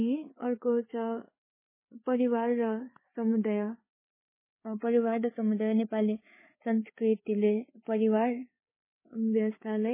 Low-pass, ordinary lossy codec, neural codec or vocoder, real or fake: 3.6 kHz; MP3, 16 kbps; codec, 16 kHz, 4 kbps, FreqCodec, larger model; fake